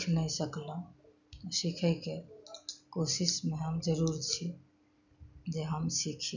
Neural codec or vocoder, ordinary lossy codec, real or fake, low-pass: none; none; real; 7.2 kHz